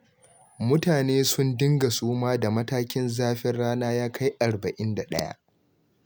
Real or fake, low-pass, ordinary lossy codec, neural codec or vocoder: real; none; none; none